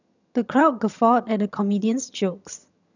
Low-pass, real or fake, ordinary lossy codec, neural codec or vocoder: 7.2 kHz; fake; none; vocoder, 22.05 kHz, 80 mel bands, HiFi-GAN